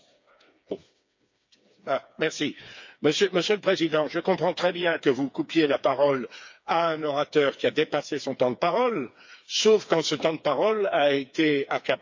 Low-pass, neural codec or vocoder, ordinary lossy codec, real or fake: 7.2 kHz; codec, 16 kHz, 4 kbps, FreqCodec, smaller model; MP3, 48 kbps; fake